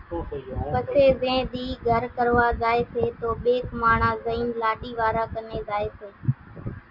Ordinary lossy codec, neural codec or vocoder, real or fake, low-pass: Opus, 64 kbps; none; real; 5.4 kHz